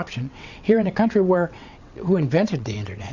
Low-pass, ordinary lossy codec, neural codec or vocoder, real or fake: 7.2 kHz; Opus, 64 kbps; vocoder, 22.05 kHz, 80 mel bands, WaveNeXt; fake